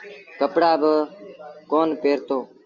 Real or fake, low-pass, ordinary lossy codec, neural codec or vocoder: real; 7.2 kHz; Opus, 64 kbps; none